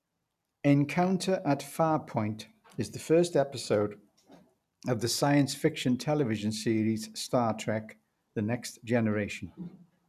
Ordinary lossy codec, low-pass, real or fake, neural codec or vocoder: none; 14.4 kHz; real; none